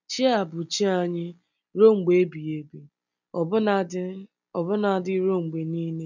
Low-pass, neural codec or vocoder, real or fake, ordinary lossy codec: 7.2 kHz; none; real; none